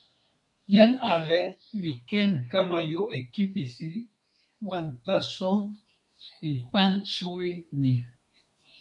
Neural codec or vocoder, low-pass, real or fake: codec, 24 kHz, 1 kbps, SNAC; 10.8 kHz; fake